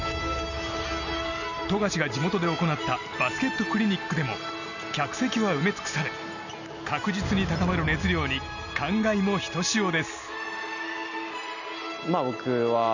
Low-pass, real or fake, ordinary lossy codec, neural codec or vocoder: 7.2 kHz; real; none; none